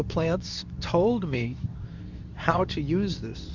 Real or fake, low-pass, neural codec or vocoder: fake; 7.2 kHz; codec, 24 kHz, 0.9 kbps, WavTokenizer, medium speech release version 2